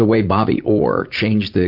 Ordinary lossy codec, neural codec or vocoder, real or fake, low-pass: MP3, 48 kbps; vocoder, 44.1 kHz, 80 mel bands, Vocos; fake; 5.4 kHz